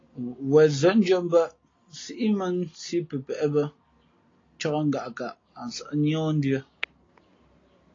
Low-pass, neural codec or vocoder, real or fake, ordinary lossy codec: 7.2 kHz; none; real; AAC, 32 kbps